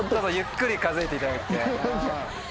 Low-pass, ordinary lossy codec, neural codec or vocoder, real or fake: none; none; none; real